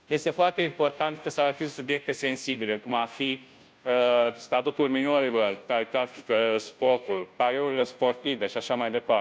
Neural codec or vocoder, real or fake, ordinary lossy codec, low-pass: codec, 16 kHz, 0.5 kbps, FunCodec, trained on Chinese and English, 25 frames a second; fake; none; none